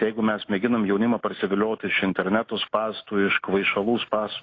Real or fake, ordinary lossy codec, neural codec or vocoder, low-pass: real; AAC, 32 kbps; none; 7.2 kHz